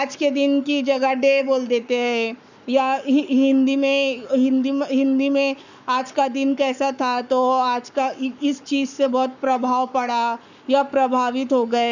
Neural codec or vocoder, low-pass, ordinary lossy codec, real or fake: codec, 44.1 kHz, 7.8 kbps, Pupu-Codec; 7.2 kHz; none; fake